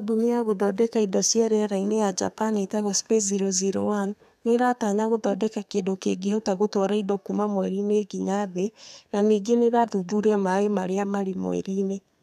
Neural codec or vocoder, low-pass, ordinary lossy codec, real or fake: codec, 32 kHz, 1.9 kbps, SNAC; 14.4 kHz; none; fake